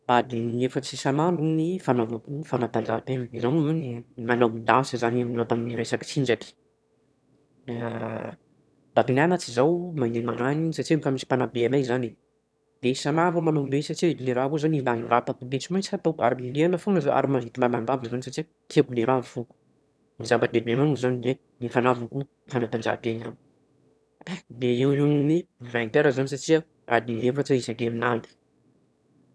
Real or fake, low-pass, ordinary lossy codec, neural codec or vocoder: fake; none; none; autoencoder, 22.05 kHz, a latent of 192 numbers a frame, VITS, trained on one speaker